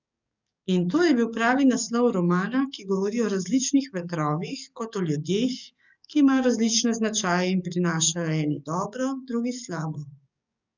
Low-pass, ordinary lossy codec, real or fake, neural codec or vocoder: 7.2 kHz; none; fake; codec, 44.1 kHz, 7.8 kbps, DAC